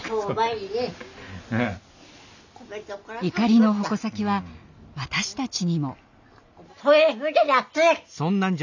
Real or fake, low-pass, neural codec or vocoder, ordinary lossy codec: real; 7.2 kHz; none; none